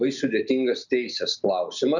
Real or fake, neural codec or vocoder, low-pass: real; none; 7.2 kHz